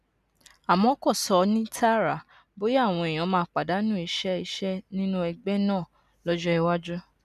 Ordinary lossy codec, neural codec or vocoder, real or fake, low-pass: none; none; real; 14.4 kHz